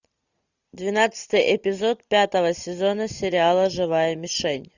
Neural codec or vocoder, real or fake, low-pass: none; real; 7.2 kHz